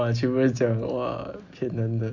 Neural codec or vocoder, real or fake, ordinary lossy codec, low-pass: none; real; MP3, 48 kbps; 7.2 kHz